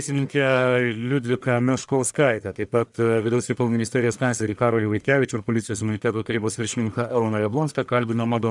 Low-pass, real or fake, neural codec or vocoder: 10.8 kHz; fake; codec, 44.1 kHz, 1.7 kbps, Pupu-Codec